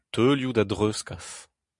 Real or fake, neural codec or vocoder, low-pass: real; none; 10.8 kHz